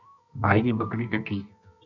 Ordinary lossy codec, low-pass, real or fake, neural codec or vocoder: MP3, 64 kbps; 7.2 kHz; fake; codec, 24 kHz, 0.9 kbps, WavTokenizer, medium music audio release